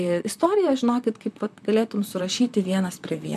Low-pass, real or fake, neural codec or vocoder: 14.4 kHz; fake; vocoder, 44.1 kHz, 128 mel bands, Pupu-Vocoder